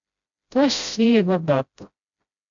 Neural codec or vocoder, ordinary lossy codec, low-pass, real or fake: codec, 16 kHz, 0.5 kbps, FreqCodec, smaller model; AAC, 64 kbps; 7.2 kHz; fake